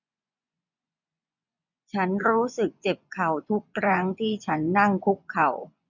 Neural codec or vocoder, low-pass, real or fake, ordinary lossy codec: vocoder, 24 kHz, 100 mel bands, Vocos; 7.2 kHz; fake; none